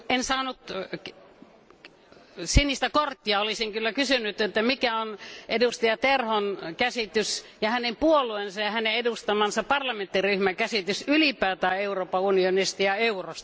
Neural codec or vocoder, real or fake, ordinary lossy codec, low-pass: none; real; none; none